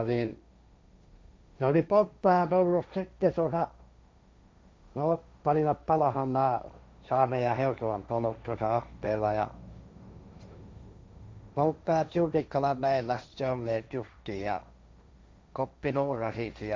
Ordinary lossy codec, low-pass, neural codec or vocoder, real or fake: none; none; codec, 16 kHz, 1.1 kbps, Voila-Tokenizer; fake